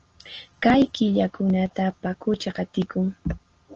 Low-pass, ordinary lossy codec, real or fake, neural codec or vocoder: 7.2 kHz; Opus, 16 kbps; real; none